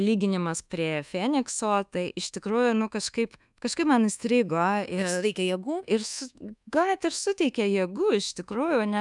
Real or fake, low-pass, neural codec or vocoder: fake; 10.8 kHz; codec, 24 kHz, 1.2 kbps, DualCodec